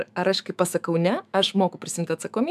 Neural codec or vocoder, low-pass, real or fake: autoencoder, 48 kHz, 128 numbers a frame, DAC-VAE, trained on Japanese speech; 14.4 kHz; fake